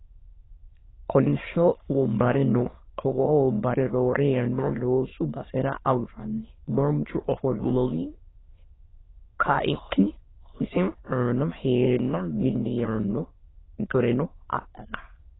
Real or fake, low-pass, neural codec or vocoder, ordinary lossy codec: fake; 7.2 kHz; autoencoder, 22.05 kHz, a latent of 192 numbers a frame, VITS, trained on many speakers; AAC, 16 kbps